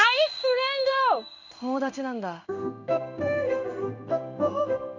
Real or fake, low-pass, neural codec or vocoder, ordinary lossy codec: fake; 7.2 kHz; codec, 16 kHz in and 24 kHz out, 1 kbps, XY-Tokenizer; none